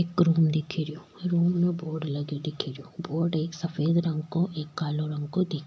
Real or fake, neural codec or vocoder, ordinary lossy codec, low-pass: real; none; none; none